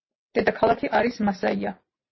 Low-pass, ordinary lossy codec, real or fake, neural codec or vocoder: 7.2 kHz; MP3, 24 kbps; real; none